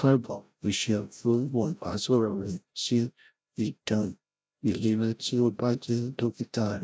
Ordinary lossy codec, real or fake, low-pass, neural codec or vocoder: none; fake; none; codec, 16 kHz, 0.5 kbps, FreqCodec, larger model